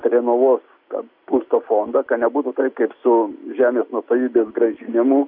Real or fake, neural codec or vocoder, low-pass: real; none; 5.4 kHz